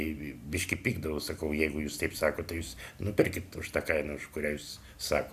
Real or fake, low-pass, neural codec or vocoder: real; 14.4 kHz; none